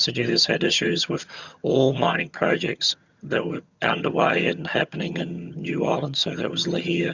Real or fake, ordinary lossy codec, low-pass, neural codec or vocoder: fake; Opus, 64 kbps; 7.2 kHz; vocoder, 22.05 kHz, 80 mel bands, HiFi-GAN